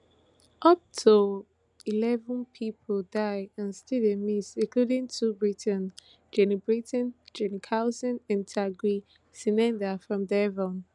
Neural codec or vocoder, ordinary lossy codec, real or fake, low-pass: none; none; real; 10.8 kHz